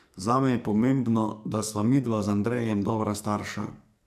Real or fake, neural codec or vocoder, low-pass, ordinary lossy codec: fake; codec, 44.1 kHz, 2.6 kbps, SNAC; 14.4 kHz; none